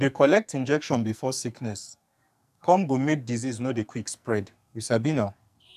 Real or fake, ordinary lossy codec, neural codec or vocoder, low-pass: fake; none; codec, 44.1 kHz, 2.6 kbps, SNAC; 14.4 kHz